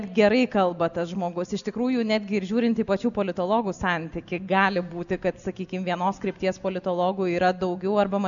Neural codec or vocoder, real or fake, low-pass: none; real; 7.2 kHz